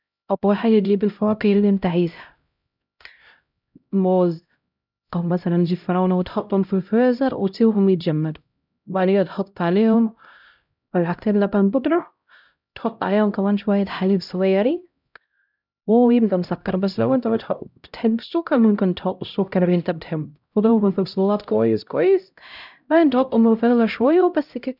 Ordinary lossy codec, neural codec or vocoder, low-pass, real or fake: none; codec, 16 kHz, 0.5 kbps, X-Codec, HuBERT features, trained on LibriSpeech; 5.4 kHz; fake